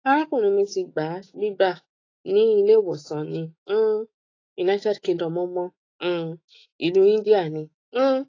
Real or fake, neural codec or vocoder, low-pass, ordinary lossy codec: fake; autoencoder, 48 kHz, 128 numbers a frame, DAC-VAE, trained on Japanese speech; 7.2 kHz; AAC, 32 kbps